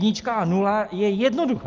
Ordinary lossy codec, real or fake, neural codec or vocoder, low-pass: Opus, 24 kbps; real; none; 7.2 kHz